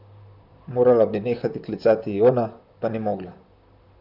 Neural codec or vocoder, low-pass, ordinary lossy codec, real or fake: vocoder, 44.1 kHz, 128 mel bands, Pupu-Vocoder; 5.4 kHz; none; fake